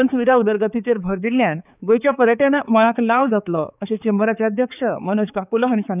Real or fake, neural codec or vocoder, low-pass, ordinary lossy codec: fake; codec, 16 kHz, 4 kbps, X-Codec, HuBERT features, trained on balanced general audio; 3.6 kHz; none